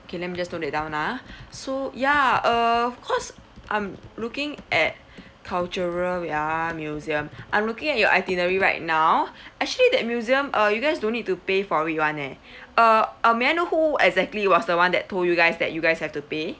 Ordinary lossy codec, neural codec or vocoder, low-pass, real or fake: none; none; none; real